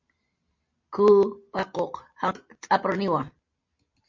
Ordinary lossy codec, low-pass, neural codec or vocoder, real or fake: MP3, 48 kbps; 7.2 kHz; none; real